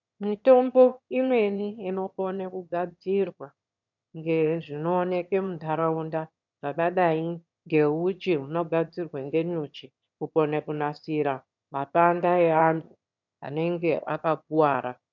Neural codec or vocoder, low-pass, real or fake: autoencoder, 22.05 kHz, a latent of 192 numbers a frame, VITS, trained on one speaker; 7.2 kHz; fake